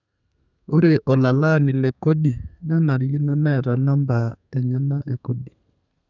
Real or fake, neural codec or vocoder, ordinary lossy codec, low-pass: fake; codec, 32 kHz, 1.9 kbps, SNAC; none; 7.2 kHz